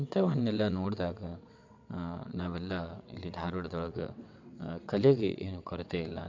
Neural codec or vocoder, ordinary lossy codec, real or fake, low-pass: vocoder, 22.05 kHz, 80 mel bands, WaveNeXt; MP3, 64 kbps; fake; 7.2 kHz